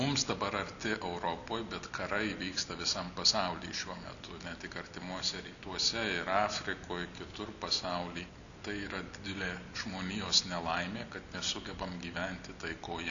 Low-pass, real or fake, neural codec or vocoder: 7.2 kHz; real; none